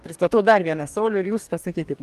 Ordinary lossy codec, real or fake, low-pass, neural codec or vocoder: Opus, 32 kbps; fake; 14.4 kHz; codec, 44.1 kHz, 2.6 kbps, DAC